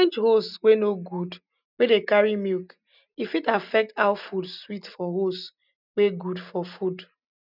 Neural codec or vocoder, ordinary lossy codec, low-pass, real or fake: none; none; 5.4 kHz; real